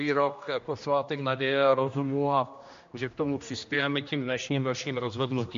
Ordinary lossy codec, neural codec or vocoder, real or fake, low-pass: MP3, 48 kbps; codec, 16 kHz, 1 kbps, X-Codec, HuBERT features, trained on general audio; fake; 7.2 kHz